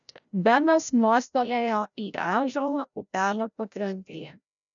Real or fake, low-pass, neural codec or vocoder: fake; 7.2 kHz; codec, 16 kHz, 0.5 kbps, FreqCodec, larger model